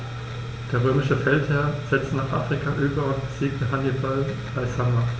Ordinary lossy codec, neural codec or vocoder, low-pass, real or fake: none; none; none; real